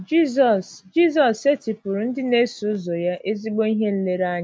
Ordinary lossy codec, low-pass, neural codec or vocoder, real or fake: none; none; none; real